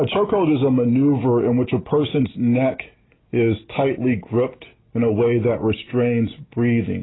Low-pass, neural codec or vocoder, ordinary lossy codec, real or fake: 7.2 kHz; none; AAC, 16 kbps; real